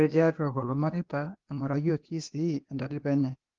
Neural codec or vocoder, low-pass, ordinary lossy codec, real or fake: codec, 16 kHz, 0.8 kbps, ZipCodec; 7.2 kHz; Opus, 32 kbps; fake